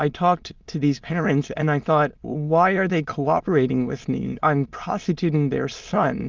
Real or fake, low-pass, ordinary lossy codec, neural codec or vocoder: fake; 7.2 kHz; Opus, 32 kbps; autoencoder, 22.05 kHz, a latent of 192 numbers a frame, VITS, trained on many speakers